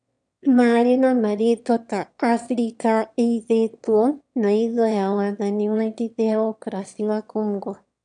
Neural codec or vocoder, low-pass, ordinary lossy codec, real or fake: autoencoder, 22.05 kHz, a latent of 192 numbers a frame, VITS, trained on one speaker; 9.9 kHz; none; fake